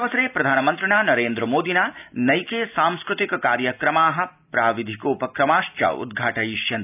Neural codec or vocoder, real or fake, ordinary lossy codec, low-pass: none; real; none; 3.6 kHz